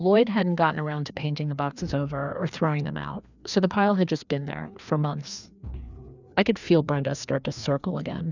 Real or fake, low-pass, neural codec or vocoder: fake; 7.2 kHz; codec, 16 kHz, 2 kbps, FreqCodec, larger model